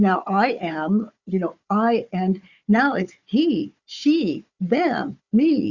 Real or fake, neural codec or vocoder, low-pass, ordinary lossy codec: fake; codec, 16 kHz, 4 kbps, FunCodec, trained on Chinese and English, 50 frames a second; 7.2 kHz; Opus, 64 kbps